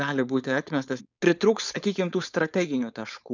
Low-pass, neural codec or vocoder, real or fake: 7.2 kHz; codec, 16 kHz, 4.8 kbps, FACodec; fake